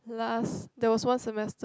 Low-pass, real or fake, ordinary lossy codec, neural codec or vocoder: none; real; none; none